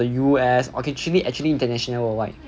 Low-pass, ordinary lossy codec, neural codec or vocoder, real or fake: none; none; none; real